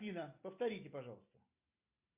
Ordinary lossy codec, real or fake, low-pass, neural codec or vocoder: MP3, 32 kbps; real; 3.6 kHz; none